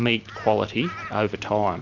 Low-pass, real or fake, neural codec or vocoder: 7.2 kHz; real; none